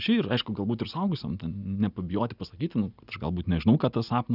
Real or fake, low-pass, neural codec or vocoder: real; 5.4 kHz; none